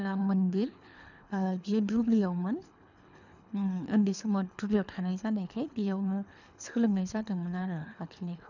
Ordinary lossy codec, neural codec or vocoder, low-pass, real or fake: none; codec, 24 kHz, 3 kbps, HILCodec; 7.2 kHz; fake